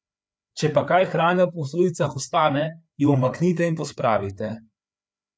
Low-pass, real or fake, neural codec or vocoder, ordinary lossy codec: none; fake; codec, 16 kHz, 4 kbps, FreqCodec, larger model; none